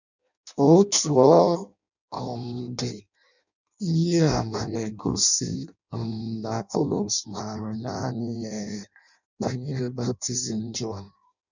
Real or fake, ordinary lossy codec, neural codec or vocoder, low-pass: fake; none; codec, 16 kHz in and 24 kHz out, 0.6 kbps, FireRedTTS-2 codec; 7.2 kHz